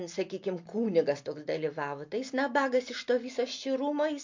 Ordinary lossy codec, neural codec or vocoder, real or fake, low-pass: MP3, 48 kbps; none; real; 7.2 kHz